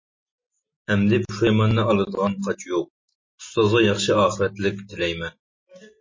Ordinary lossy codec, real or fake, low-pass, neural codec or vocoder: MP3, 32 kbps; real; 7.2 kHz; none